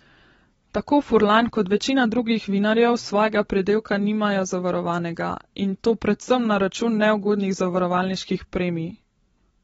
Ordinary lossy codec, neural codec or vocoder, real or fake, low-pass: AAC, 24 kbps; none; real; 19.8 kHz